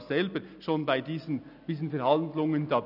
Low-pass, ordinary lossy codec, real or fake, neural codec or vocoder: 5.4 kHz; none; real; none